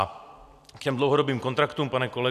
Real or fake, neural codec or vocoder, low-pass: real; none; 14.4 kHz